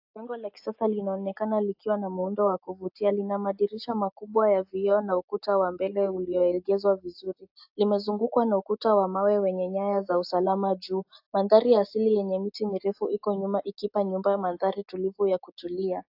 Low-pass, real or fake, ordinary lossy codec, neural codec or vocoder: 5.4 kHz; real; AAC, 48 kbps; none